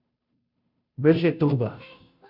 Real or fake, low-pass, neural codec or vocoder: fake; 5.4 kHz; codec, 16 kHz, 0.5 kbps, FunCodec, trained on Chinese and English, 25 frames a second